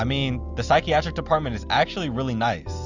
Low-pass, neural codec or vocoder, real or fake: 7.2 kHz; none; real